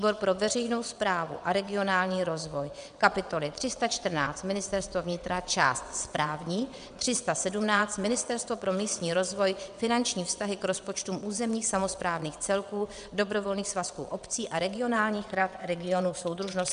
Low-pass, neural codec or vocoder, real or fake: 9.9 kHz; vocoder, 22.05 kHz, 80 mel bands, WaveNeXt; fake